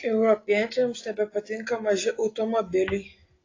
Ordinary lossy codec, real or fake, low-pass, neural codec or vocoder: AAC, 32 kbps; real; 7.2 kHz; none